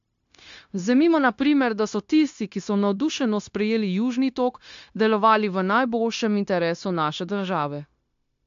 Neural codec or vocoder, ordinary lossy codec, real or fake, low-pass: codec, 16 kHz, 0.9 kbps, LongCat-Audio-Codec; AAC, 64 kbps; fake; 7.2 kHz